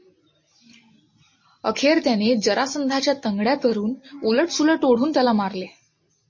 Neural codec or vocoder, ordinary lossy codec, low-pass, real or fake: none; MP3, 32 kbps; 7.2 kHz; real